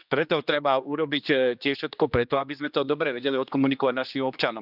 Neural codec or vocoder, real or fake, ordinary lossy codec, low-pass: codec, 16 kHz, 2 kbps, X-Codec, HuBERT features, trained on general audio; fake; none; 5.4 kHz